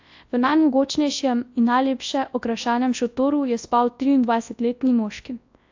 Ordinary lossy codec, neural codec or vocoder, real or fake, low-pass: AAC, 48 kbps; codec, 24 kHz, 0.9 kbps, WavTokenizer, large speech release; fake; 7.2 kHz